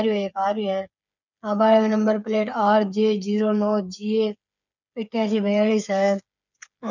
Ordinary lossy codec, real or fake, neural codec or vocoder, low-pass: none; fake; codec, 16 kHz, 8 kbps, FreqCodec, smaller model; 7.2 kHz